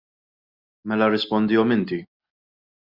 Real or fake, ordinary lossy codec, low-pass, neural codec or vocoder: real; Opus, 64 kbps; 5.4 kHz; none